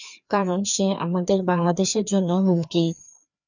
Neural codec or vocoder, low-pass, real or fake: codec, 16 kHz, 2 kbps, FreqCodec, larger model; 7.2 kHz; fake